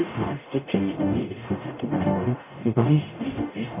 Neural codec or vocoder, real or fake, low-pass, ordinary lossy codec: codec, 44.1 kHz, 0.9 kbps, DAC; fake; 3.6 kHz; none